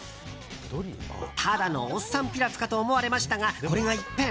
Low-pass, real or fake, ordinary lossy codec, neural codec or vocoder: none; real; none; none